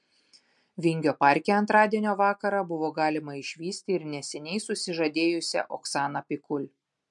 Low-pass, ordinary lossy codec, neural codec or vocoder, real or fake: 10.8 kHz; MP3, 64 kbps; none; real